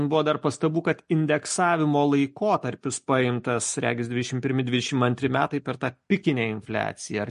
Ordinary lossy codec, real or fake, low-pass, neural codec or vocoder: MP3, 48 kbps; real; 10.8 kHz; none